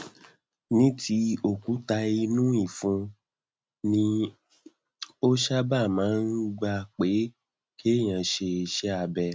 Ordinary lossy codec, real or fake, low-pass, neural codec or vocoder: none; real; none; none